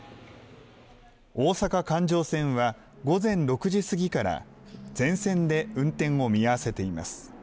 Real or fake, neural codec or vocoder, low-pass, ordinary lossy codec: real; none; none; none